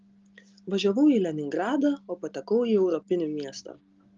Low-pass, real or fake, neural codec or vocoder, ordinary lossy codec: 7.2 kHz; real; none; Opus, 32 kbps